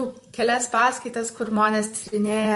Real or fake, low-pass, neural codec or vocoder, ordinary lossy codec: fake; 14.4 kHz; vocoder, 44.1 kHz, 128 mel bands, Pupu-Vocoder; MP3, 48 kbps